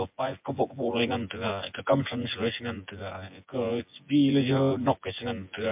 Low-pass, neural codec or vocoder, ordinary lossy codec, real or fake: 3.6 kHz; vocoder, 24 kHz, 100 mel bands, Vocos; MP3, 32 kbps; fake